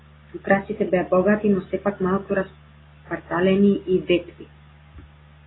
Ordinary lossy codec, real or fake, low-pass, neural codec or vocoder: AAC, 16 kbps; real; 7.2 kHz; none